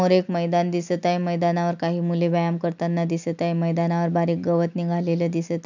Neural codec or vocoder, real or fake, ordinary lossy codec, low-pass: none; real; none; 7.2 kHz